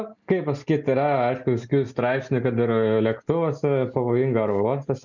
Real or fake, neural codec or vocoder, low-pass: real; none; 7.2 kHz